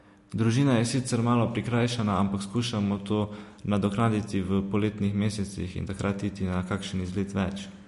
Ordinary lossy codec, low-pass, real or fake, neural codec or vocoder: MP3, 48 kbps; 14.4 kHz; real; none